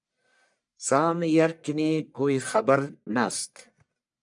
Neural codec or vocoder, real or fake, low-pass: codec, 44.1 kHz, 1.7 kbps, Pupu-Codec; fake; 10.8 kHz